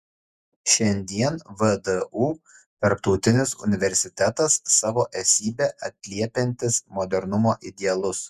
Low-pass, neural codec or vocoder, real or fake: 14.4 kHz; vocoder, 48 kHz, 128 mel bands, Vocos; fake